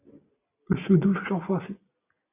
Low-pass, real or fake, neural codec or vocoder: 3.6 kHz; real; none